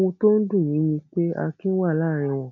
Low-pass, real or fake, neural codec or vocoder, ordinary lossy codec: 7.2 kHz; real; none; none